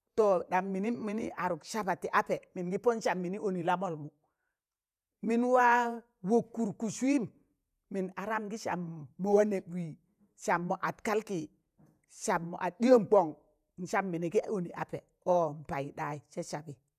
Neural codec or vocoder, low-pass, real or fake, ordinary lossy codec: vocoder, 48 kHz, 128 mel bands, Vocos; 14.4 kHz; fake; none